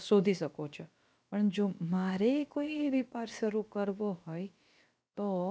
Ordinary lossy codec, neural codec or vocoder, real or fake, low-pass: none; codec, 16 kHz, about 1 kbps, DyCAST, with the encoder's durations; fake; none